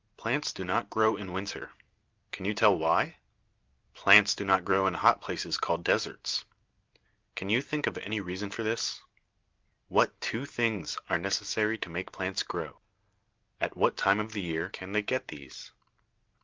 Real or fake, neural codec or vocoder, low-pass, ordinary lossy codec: real; none; 7.2 kHz; Opus, 24 kbps